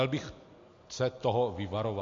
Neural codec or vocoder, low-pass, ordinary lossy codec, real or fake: none; 7.2 kHz; AAC, 48 kbps; real